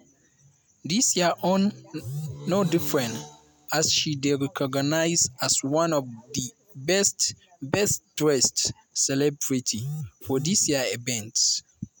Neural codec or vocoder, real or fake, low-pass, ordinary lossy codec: none; real; none; none